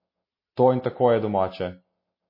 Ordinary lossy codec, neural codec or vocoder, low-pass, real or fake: MP3, 24 kbps; none; 5.4 kHz; real